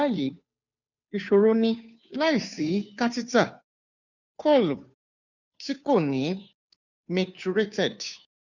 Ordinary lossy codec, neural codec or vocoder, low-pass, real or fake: none; codec, 16 kHz, 2 kbps, FunCodec, trained on Chinese and English, 25 frames a second; 7.2 kHz; fake